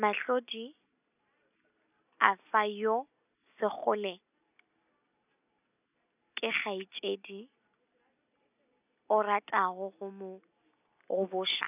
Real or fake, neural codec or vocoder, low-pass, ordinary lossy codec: real; none; 3.6 kHz; none